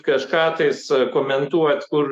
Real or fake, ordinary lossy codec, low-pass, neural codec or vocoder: fake; MP3, 96 kbps; 14.4 kHz; autoencoder, 48 kHz, 128 numbers a frame, DAC-VAE, trained on Japanese speech